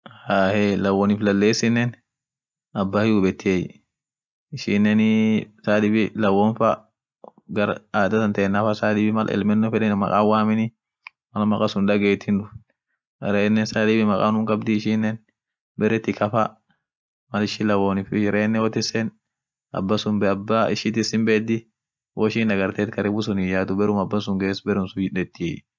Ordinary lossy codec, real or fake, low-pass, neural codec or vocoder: none; real; none; none